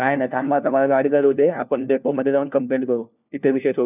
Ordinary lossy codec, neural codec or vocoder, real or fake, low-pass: none; codec, 16 kHz, 1 kbps, FunCodec, trained on LibriTTS, 50 frames a second; fake; 3.6 kHz